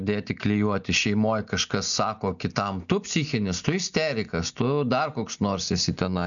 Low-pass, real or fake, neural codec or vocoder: 7.2 kHz; real; none